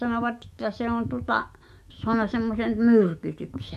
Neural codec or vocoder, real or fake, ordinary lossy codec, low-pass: autoencoder, 48 kHz, 128 numbers a frame, DAC-VAE, trained on Japanese speech; fake; MP3, 64 kbps; 14.4 kHz